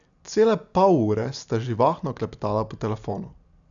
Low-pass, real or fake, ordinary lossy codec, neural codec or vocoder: 7.2 kHz; real; none; none